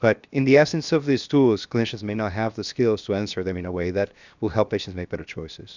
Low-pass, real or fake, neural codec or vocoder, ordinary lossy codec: 7.2 kHz; fake; codec, 16 kHz, 0.7 kbps, FocalCodec; Opus, 64 kbps